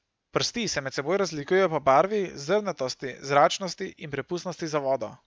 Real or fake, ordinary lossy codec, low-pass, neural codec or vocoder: real; none; none; none